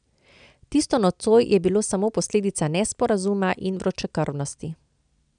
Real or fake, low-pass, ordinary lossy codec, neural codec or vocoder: real; 9.9 kHz; none; none